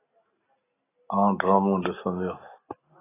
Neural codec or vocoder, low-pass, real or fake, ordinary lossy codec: none; 3.6 kHz; real; AAC, 16 kbps